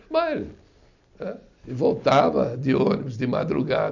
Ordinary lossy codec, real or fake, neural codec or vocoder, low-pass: none; real; none; 7.2 kHz